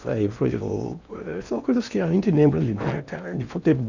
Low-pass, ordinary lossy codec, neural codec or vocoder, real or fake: 7.2 kHz; none; codec, 16 kHz in and 24 kHz out, 0.8 kbps, FocalCodec, streaming, 65536 codes; fake